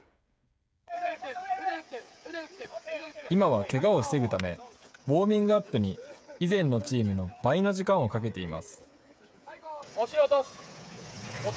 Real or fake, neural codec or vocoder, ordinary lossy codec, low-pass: fake; codec, 16 kHz, 8 kbps, FreqCodec, smaller model; none; none